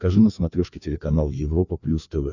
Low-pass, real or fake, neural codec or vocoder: 7.2 kHz; fake; codec, 16 kHz, 2 kbps, FreqCodec, larger model